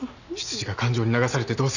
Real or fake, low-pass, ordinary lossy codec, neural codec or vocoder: real; 7.2 kHz; none; none